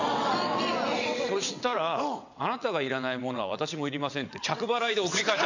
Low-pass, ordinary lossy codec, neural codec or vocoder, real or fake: 7.2 kHz; none; vocoder, 22.05 kHz, 80 mel bands, WaveNeXt; fake